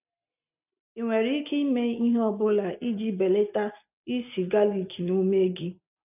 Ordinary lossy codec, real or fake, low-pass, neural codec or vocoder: none; real; 3.6 kHz; none